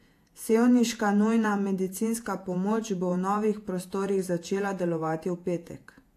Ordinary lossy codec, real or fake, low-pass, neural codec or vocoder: AAC, 64 kbps; fake; 14.4 kHz; vocoder, 48 kHz, 128 mel bands, Vocos